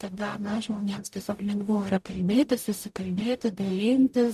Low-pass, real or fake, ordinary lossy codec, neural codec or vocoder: 14.4 kHz; fake; MP3, 96 kbps; codec, 44.1 kHz, 0.9 kbps, DAC